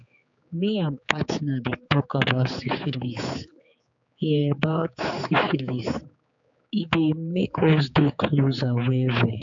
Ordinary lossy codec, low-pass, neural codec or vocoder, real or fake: AAC, 64 kbps; 7.2 kHz; codec, 16 kHz, 4 kbps, X-Codec, HuBERT features, trained on general audio; fake